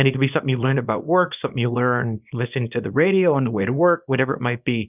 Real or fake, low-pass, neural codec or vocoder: fake; 3.6 kHz; codec, 24 kHz, 0.9 kbps, WavTokenizer, small release